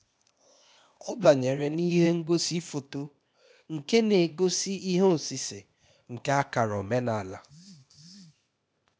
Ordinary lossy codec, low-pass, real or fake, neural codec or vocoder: none; none; fake; codec, 16 kHz, 0.8 kbps, ZipCodec